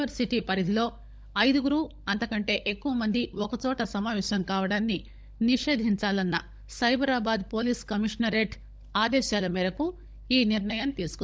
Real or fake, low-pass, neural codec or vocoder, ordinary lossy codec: fake; none; codec, 16 kHz, 16 kbps, FunCodec, trained on LibriTTS, 50 frames a second; none